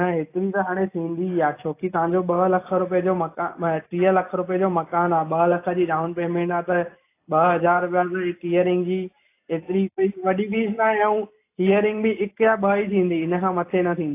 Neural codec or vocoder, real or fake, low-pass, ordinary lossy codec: none; real; 3.6 kHz; AAC, 24 kbps